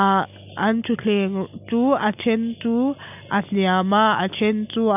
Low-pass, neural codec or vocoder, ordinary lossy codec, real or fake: 3.6 kHz; none; none; real